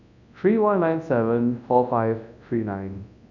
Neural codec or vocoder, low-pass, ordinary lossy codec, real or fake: codec, 24 kHz, 0.9 kbps, WavTokenizer, large speech release; 7.2 kHz; none; fake